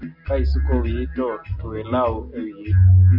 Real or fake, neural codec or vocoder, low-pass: real; none; 5.4 kHz